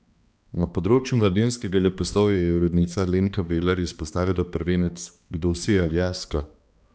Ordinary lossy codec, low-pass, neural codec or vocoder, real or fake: none; none; codec, 16 kHz, 2 kbps, X-Codec, HuBERT features, trained on balanced general audio; fake